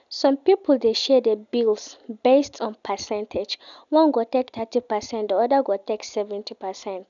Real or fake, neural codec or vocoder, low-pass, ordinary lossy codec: real; none; 7.2 kHz; none